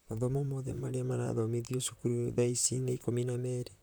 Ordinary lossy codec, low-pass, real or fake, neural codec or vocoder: none; none; fake; vocoder, 44.1 kHz, 128 mel bands, Pupu-Vocoder